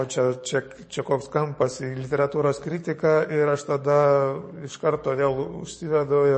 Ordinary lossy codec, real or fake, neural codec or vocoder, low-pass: MP3, 32 kbps; fake; codec, 44.1 kHz, 7.8 kbps, DAC; 9.9 kHz